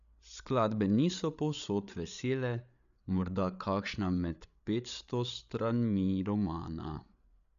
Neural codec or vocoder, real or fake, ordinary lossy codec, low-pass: codec, 16 kHz, 8 kbps, FreqCodec, larger model; fake; none; 7.2 kHz